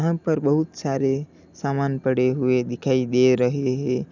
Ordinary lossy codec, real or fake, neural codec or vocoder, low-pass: none; real; none; 7.2 kHz